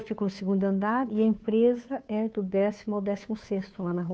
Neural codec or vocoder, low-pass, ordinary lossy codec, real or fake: codec, 16 kHz, 2 kbps, FunCodec, trained on Chinese and English, 25 frames a second; none; none; fake